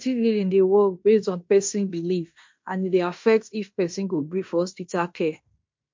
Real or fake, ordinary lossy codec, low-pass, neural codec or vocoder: fake; MP3, 48 kbps; 7.2 kHz; codec, 16 kHz in and 24 kHz out, 0.9 kbps, LongCat-Audio-Codec, fine tuned four codebook decoder